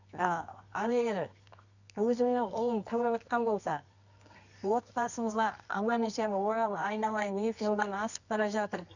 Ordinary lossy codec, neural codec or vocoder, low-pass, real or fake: none; codec, 24 kHz, 0.9 kbps, WavTokenizer, medium music audio release; 7.2 kHz; fake